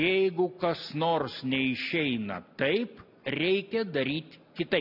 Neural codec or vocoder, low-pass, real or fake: none; 5.4 kHz; real